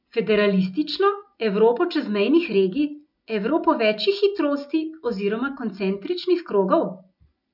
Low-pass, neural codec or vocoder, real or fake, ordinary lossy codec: 5.4 kHz; none; real; none